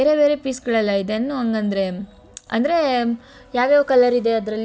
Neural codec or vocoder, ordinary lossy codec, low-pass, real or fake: none; none; none; real